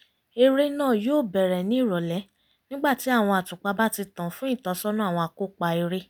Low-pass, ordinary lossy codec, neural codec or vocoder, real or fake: none; none; none; real